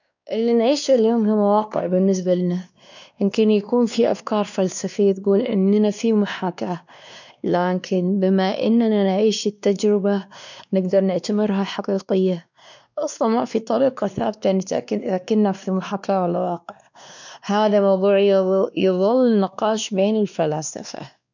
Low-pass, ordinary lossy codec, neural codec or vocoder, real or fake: 7.2 kHz; none; codec, 16 kHz, 2 kbps, X-Codec, WavLM features, trained on Multilingual LibriSpeech; fake